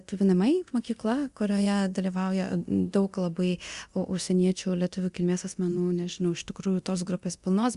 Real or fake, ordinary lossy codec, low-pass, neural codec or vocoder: fake; Opus, 64 kbps; 10.8 kHz; codec, 24 kHz, 0.9 kbps, DualCodec